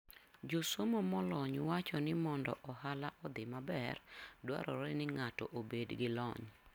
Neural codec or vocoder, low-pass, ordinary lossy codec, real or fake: none; 19.8 kHz; none; real